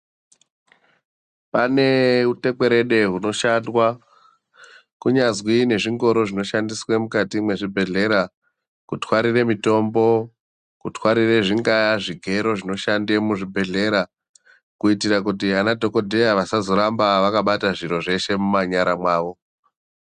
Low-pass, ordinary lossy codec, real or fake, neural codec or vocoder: 9.9 kHz; Opus, 64 kbps; real; none